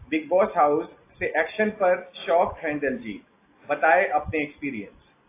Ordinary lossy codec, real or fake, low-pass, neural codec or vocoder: AAC, 24 kbps; real; 3.6 kHz; none